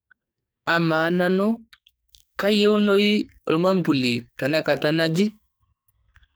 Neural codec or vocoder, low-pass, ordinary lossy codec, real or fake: codec, 44.1 kHz, 2.6 kbps, SNAC; none; none; fake